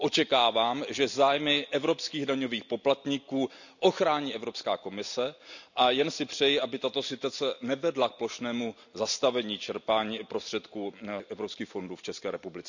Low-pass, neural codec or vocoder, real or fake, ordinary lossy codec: 7.2 kHz; none; real; none